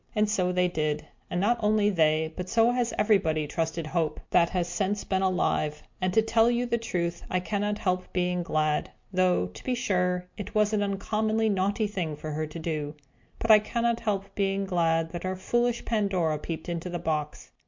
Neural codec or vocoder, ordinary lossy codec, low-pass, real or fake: none; MP3, 48 kbps; 7.2 kHz; real